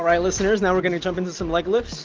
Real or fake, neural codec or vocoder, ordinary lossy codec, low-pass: real; none; Opus, 24 kbps; 7.2 kHz